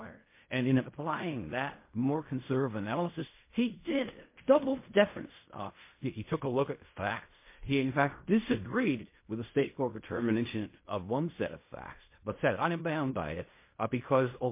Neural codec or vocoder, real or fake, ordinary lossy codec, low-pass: codec, 16 kHz in and 24 kHz out, 0.4 kbps, LongCat-Audio-Codec, fine tuned four codebook decoder; fake; MP3, 24 kbps; 3.6 kHz